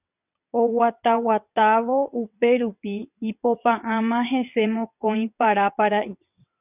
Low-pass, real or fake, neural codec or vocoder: 3.6 kHz; fake; vocoder, 22.05 kHz, 80 mel bands, WaveNeXt